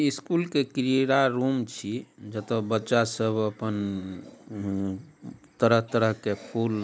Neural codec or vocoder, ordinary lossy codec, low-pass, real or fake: none; none; none; real